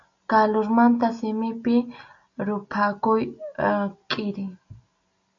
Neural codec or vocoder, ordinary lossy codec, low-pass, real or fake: none; AAC, 64 kbps; 7.2 kHz; real